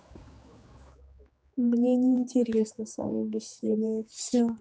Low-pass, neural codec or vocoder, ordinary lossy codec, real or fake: none; codec, 16 kHz, 4 kbps, X-Codec, HuBERT features, trained on general audio; none; fake